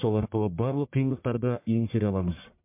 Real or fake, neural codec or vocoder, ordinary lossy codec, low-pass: fake; codec, 44.1 kHz, 1.7 kbps, Pupu-Codec; MP3, 32 kbps; 3.6 kHz